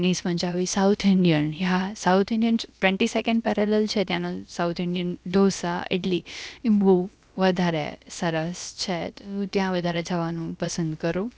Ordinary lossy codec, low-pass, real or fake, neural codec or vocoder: none; none; fake; codec, 16 kHz, about 1 kbps, DyCAST, with the encoder's durations